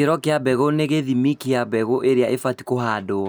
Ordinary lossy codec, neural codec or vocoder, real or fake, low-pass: none; none; real; none